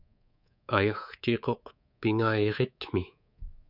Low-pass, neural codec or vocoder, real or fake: 5.4 kHz; codec, 24 kHz, 3.1 kbps, DualCodec; fake